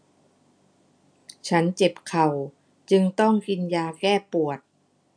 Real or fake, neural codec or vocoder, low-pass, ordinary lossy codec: real; none; 9.9 kHz; none